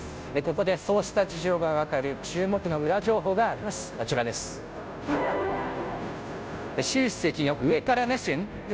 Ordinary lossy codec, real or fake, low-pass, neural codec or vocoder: none; fake; none; codec, 16 kHz, 0.5 kbps, FunCodec, trained on Chinese and English, 25 frames a second